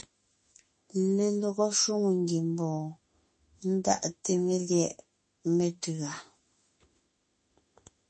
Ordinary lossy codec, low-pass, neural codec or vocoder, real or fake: MP3, 32 kbps; 10.8 kHz; autoencoder, 48 kHz, 32 numbers a frame, DAC-VAE, trained on Japanese speech; fake